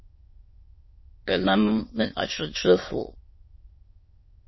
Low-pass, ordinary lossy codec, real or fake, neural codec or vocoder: 7.2 kHz; MP3, 24 kbps; fake; autoencoder, 22.05 kHz, a latent of 192 numbers a frame, VITS, trained on many speakers